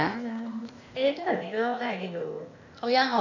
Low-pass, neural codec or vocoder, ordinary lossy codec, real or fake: 7.2 kHz; codec, 16 kHz, 0.8 kbps, ZipCodec; none; fake